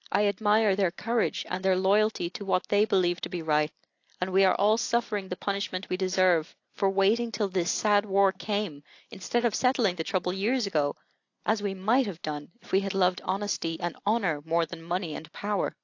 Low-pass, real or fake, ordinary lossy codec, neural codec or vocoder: 7.2 kHz; real; AAC, 48 kbps; none